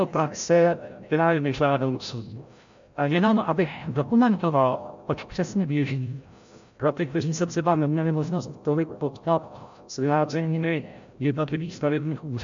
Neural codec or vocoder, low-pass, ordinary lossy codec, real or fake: codec, 16 kHz, 0.5 kbps, FreqCodec, larger model; 7.2 kHz; MP3, 64 kbps; fake